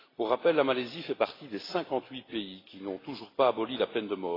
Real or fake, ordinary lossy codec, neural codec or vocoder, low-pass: real; AAC, 24 kbps; none; 5.4 kHz